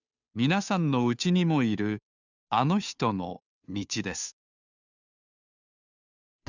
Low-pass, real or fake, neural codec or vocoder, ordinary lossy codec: 7.2 kHz; fake; codec, 16 kHz, 2 kbps, FunCodec, trained on Chinese and English, 25 frames a second; none